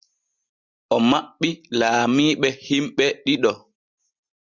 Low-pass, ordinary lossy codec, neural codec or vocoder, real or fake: 7.2 kHz; Opus, 64 kbps; none; real